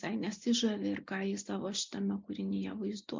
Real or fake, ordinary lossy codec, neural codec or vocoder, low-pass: real; MP3, 64 kbps; none; 7.2 kHz